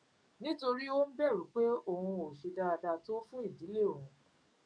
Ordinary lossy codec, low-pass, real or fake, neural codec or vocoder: none; 9.9 kHz; fake; codec, 44.1 kHz, 7.8 kbps, DAC